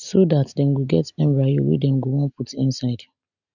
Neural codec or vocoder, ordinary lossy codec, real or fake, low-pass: none; none; real; 7.2 kHz